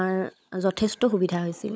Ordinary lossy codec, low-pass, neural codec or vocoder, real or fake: none; none; codec, 16 kHz, 16 kbps, FunCodec, trained on LibriTTS, 50 frames a second; fake